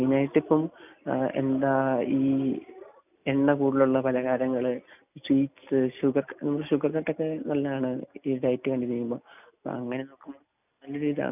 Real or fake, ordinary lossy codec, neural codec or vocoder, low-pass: real; none; none; 3.6 kHz